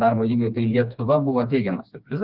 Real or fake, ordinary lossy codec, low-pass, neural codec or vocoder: fake; Opus, 32 kbps; 5.4 kHz; codec, 16 kHz, 2 kbps, FreqCodec, smaller model